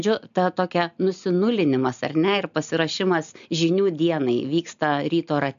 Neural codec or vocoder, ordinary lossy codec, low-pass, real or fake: none; AAC, 96 kbps; 7.2 kHz; real